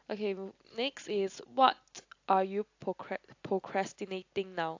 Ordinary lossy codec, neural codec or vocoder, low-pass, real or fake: AAC, 48 kbps; none; 7.2 kHz; real